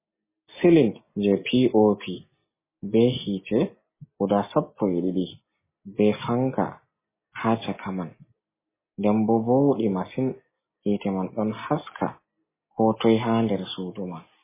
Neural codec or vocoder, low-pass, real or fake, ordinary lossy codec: none; 3.6 kHz; real; MP3, 16 kbps